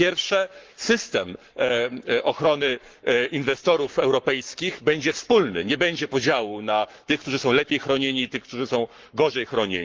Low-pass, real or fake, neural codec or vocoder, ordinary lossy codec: 7.2 kHz; fake; codec, 44.1 kHz, 7.8 kbps, Pupu-Codec; Opus, 32 kbps